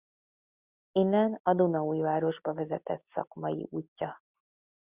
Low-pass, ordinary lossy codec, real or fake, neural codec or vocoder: 3.6 kHz; Opus, 64 kbps; real; none